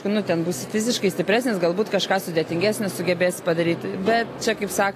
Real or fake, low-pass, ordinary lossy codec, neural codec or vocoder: real; 14.4 kHz; AAC, 48 kbps; none